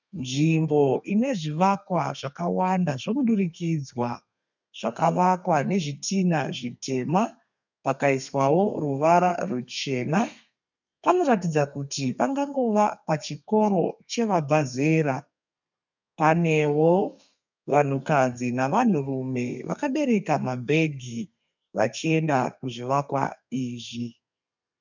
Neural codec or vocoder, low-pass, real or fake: codec, 32 kHz, 1.9 kbps, SNAC; 7.2 kHz; fake